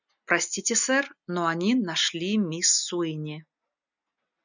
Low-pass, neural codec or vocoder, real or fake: 7.2 kHz; none; real